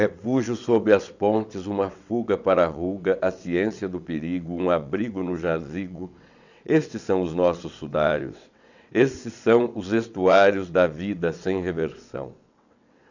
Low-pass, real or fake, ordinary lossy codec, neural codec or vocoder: 7.2 kHz; fake; none; vocoder, 22.05 kHz, 80 mel bands, WaveNeXt